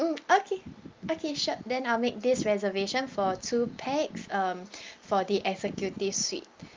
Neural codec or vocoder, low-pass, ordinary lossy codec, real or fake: none; 7.2 kHz; Opus, 24 kbps; real